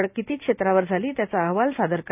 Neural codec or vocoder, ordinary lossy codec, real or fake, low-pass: none; none; real; 3.6 kHz